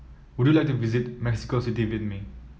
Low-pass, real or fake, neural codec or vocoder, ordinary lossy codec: none; real; none; none